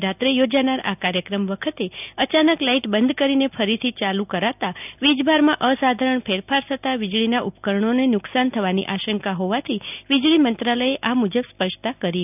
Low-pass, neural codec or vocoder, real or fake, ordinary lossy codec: 3.6 kHz; none; real; none